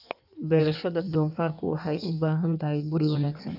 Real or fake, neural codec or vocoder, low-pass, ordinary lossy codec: fake; codec, 16 kHz in and 24 kHz out, 1.1 kbps, FireRedTTS-2 codec; 5.4 kHz; none